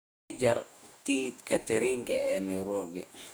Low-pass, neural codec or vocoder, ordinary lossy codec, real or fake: none; codec, 44.1 kHz, 2.6 kbps, DAC; none; fake